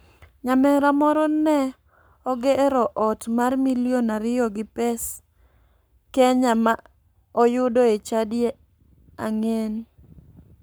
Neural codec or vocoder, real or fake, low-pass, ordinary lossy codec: codec, 44.1 kHz, 7.8 kbps, Pupu-Codec; fake; none; none